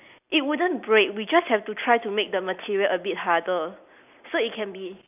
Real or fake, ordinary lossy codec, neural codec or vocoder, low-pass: real; none; none; 3.6 kHz